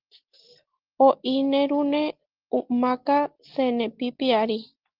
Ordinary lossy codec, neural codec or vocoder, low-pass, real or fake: Opus, 16 kbps; none; 5.4 kHz; real